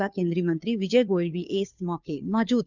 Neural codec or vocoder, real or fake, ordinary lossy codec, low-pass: codec, 16 kHz, 2 kbps, FunCodec, trained on Chinese and English, 25 frames a second; fake; none; 7.2 kHz